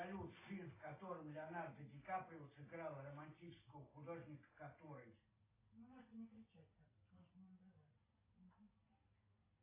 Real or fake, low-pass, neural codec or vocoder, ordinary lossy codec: real; 3.6 kHz; none; AAC, 16 kbps